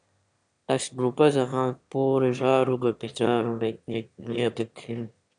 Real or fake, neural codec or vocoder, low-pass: fake; autoencoder, 22.05 kHz, a latent of 192 numbers a frame, VITS, trained on one speaker; 9.9 kHz